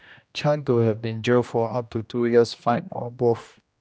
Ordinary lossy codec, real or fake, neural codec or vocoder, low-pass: none; fake; codec, 16 kHz, 1 kbps, X-Codec, HuBERT features, trained on general audio; none